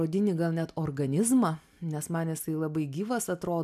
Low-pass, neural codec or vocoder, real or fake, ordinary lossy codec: 14.4 kHz; none; real; MP3, 96 kbps